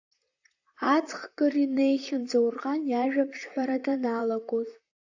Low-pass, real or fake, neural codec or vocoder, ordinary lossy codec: 7.2 kHz; fake; vocoder, 44.1 kHz, 128 mel bands, Pupu-Vocoder; AAC, 48 kbps